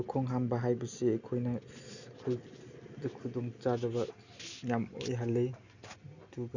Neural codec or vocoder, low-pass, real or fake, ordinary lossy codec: none; 7.2 kHz; real; none